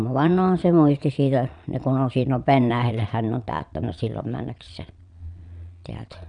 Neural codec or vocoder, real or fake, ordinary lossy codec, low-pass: vocoder, 22.05 kHz, 80 mel bands, Vocos; fake; none; 9.9 kHz